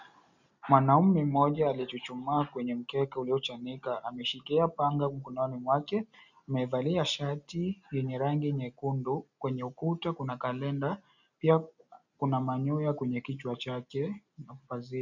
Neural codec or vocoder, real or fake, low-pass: none; real; 7.2 kHz